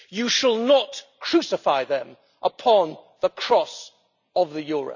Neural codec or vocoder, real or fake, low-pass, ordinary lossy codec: none; real; 7.2 kHz; none